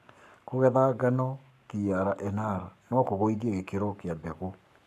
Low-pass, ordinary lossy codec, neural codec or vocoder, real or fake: 14.4 kHz; none; codec, 44.1 kHz, 7.8 kbps, Pupu-Codec; fake